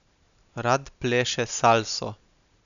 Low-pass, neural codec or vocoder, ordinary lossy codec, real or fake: 7.2 kHz; none; none; real